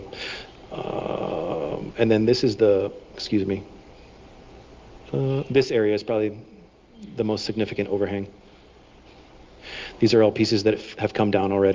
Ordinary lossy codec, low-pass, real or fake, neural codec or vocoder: Opus, 24 kbps; 7.2 kHz; real; none